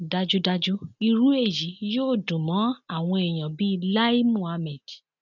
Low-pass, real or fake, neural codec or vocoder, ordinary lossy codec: 7.2 kHz; real; none; none